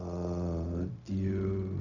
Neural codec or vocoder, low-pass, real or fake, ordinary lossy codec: codec, 16 kHz, 0.4 kbps, LongCat-Audio-Codec; 7.2 kHz; fake; none